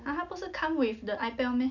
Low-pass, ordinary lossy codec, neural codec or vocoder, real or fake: 7.2 kHz; none; none; real